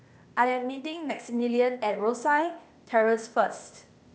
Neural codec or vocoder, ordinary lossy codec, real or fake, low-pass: codec, 16 kHz, 0.8 kbps, ZipCodec; none; fake; none